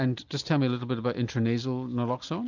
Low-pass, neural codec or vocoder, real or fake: 7.2 kHz; none; real